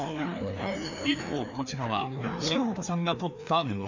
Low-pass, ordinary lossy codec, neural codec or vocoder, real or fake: 7.2 kHz; none; codec, 16 kHz, 2 kbps, FreqCodec, larger model; fake